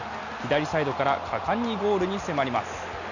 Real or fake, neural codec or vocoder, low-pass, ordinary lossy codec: real; none; 7.2 kHz; none